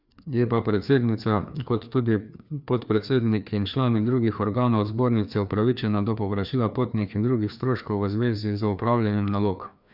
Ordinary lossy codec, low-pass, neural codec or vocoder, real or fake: none; 5.4 kHz; codec, 16 kHz, 2 kbps, FreqCodec, larger model; fake